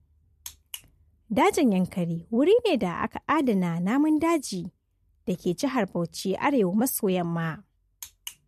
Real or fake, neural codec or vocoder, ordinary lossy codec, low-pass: real; none; MP3, 64 kbps; 14.4 kHz